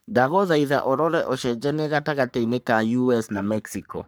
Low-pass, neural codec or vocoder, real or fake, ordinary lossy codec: none; codec, 44.1 kHz, 3.4 kbps, Pupu-Codec; fake; none